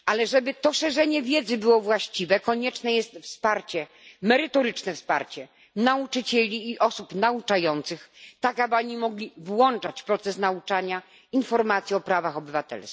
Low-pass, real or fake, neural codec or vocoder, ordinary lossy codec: none; real; none; none